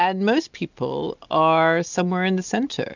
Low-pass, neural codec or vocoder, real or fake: 7.2 kHz; none; real